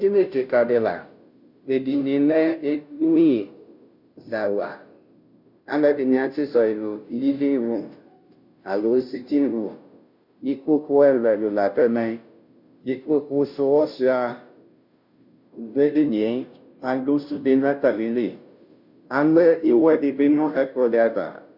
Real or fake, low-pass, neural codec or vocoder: fake; 5.4 kHz; codec, 16 kHz, 0.5 kbps, FunCodec, trained on Chinese and English, 25 frames a second